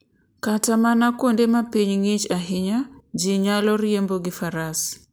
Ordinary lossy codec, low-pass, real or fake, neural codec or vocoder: none; none; real; none